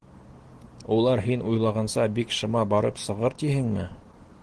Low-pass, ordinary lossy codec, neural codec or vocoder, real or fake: 10.8 kHz; Opus, 16 kbps; none; real